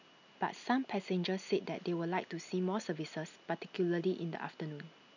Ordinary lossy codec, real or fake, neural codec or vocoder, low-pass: none; real; none; 7.2 kHz